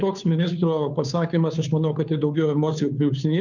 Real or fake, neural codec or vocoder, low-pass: fake; codec, 16 kHz, 2 kbps, FunCodec, trained on Chinese and English, 25 frames a second; 7.2 kHz